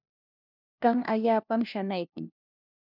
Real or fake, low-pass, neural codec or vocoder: fake; 5.4 kHz; codec, 16 kHz, 1 kbps, FunCodec, trained on LibriTTS, 50 frames a second